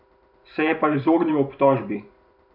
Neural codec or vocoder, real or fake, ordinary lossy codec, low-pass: vocoder, 44.1 kHz, 128 mel bands every 512 samples, BigVGAN v2; fake; none; 5.4 kHz